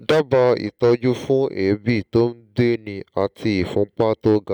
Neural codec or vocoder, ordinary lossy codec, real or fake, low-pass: none; none; real; 19.8 kHz